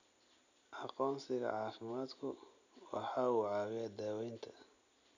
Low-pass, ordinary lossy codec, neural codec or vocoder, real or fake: 7.2 kHz; none; none; real